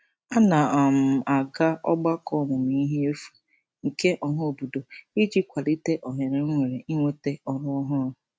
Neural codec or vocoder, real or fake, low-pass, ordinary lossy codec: none; real; none; none